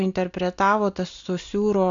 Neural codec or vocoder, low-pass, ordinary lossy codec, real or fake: none; 7.2 kHz; AAC, 64 kbps; real